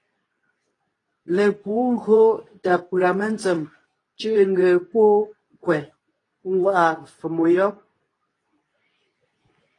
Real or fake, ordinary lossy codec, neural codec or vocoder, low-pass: fake; AAC, 32 kbps; codec, 24 kHz, 0.9 kbps, WavTokenizer, medium speech release version 2; 10.8 kHz